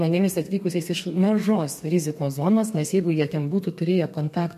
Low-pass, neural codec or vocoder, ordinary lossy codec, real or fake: 14.4 kHz; codec, 32 kHz, 1.9 kbps, SNAC; MP3, 64 kbps; fake